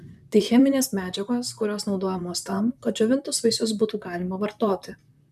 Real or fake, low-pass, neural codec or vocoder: fake; 14.4 kHz; vocoder, 44.1 kHz, 128 mel bands, Pupu-Vocoder